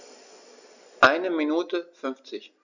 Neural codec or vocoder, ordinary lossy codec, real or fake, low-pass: none; none; real; 7.2 kHz